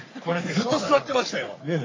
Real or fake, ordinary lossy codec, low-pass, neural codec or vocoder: fake; AAC, 32 kbps; 7.2 kHz; codec, 44.1 kHz, 3.4 kbps, Pupu-Codec